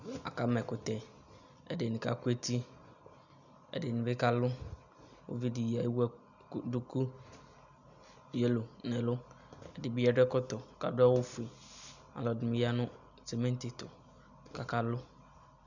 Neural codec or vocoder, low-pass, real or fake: none; 7.2 kHz; real